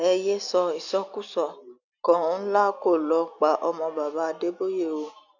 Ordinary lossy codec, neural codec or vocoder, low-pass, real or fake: none; none; 7.2 kHz; real